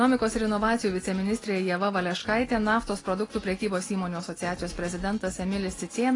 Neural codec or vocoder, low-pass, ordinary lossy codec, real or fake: none; 10.8 kHz; AAC, 32 kbps; real